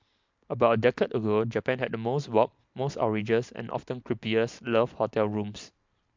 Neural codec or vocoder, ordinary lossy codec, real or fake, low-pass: none; MP3, 64 kbps; real; 7.2 kHz